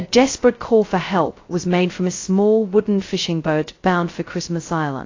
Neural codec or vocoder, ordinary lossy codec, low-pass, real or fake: codec, 16 kHz, 0.2 kbps, FocalCodec; AAC, 32 kbps; 7.2 kHz; fake